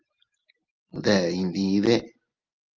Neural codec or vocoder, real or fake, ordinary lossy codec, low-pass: none; real; Opus, 24 kbps; 7.2 kHz